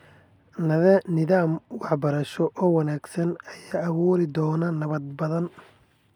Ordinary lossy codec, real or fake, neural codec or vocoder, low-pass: none; real; none; 19.8 kHz